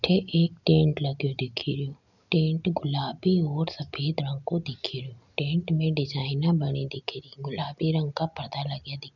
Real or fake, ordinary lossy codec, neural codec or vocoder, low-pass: real; none; none; 7.2 kHz